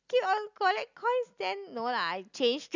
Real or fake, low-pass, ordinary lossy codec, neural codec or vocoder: real; 7.2 kHz; none; none